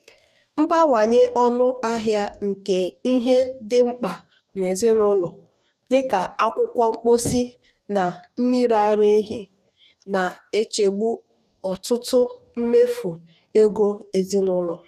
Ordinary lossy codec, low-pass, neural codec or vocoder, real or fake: none; 14.4 kHz; codec, 44.1 kHz, 2.6 kbps, DAC; fake